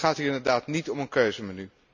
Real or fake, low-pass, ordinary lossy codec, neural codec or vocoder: real; 7.2 kHz; none; none